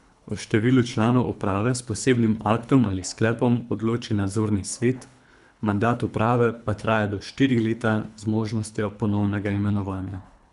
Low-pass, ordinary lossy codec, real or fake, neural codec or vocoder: 10.8 kHz; none; fake; codec, 24 kHz, 3 kbps, HILCodec